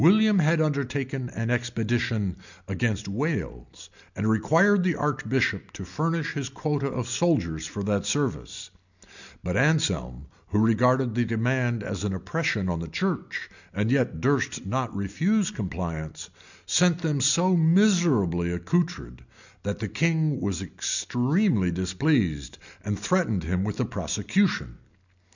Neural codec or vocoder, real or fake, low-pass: none; real; 7.2 kHz